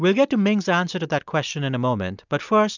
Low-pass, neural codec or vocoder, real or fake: 7.2 kHz; none; real